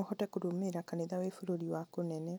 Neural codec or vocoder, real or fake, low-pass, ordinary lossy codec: none; real; none; none